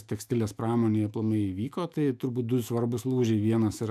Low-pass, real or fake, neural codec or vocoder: 14.4 kHz; fake; autoencoder, 48 kHz, 128 numbers a frame, DAC-VAE, trained on Japanese speech